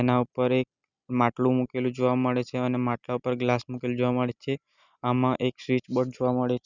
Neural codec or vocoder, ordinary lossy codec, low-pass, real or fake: none; none; 7.2 kHz; real